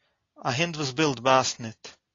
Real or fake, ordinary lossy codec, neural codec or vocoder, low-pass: real; AAC, 32 kbps; none; 7.2 kHz